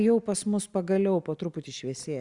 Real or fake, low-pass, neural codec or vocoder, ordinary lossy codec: real; 10.8 kHz; none; Opus, 64 kbps